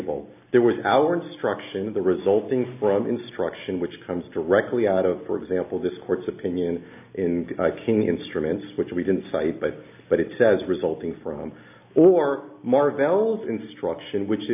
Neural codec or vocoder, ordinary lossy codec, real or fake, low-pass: none; AAC, 32 kbps; real; 3.6 kHz